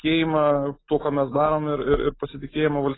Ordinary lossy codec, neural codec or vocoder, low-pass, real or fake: AAC, 16 kbps; none; 7.2 kHz; real